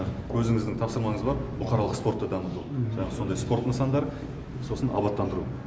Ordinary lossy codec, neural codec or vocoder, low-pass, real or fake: none; none; none; real